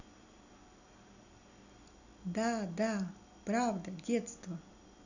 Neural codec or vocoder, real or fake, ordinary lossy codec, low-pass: none; real; none; 7.2 kHz